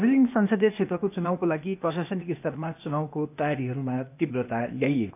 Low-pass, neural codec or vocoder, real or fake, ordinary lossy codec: 3.6 kHz; codec, 16 kHz, 0.8 kbps, ZipCodec; fake; none